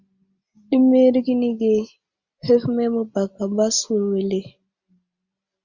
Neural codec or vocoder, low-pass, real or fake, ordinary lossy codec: none; 7.2 kHz; real; Opus, 64 kbps